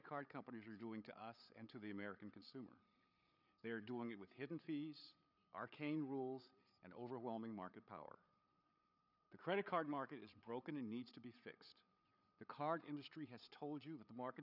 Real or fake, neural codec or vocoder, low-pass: fake; codec, 16 kHz, 8 kbps, FreqCodec, larger model; 5.4 kHz